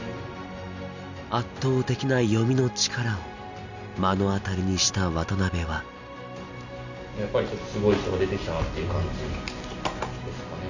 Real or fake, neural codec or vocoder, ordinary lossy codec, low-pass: real; none; none; 7.2 kHz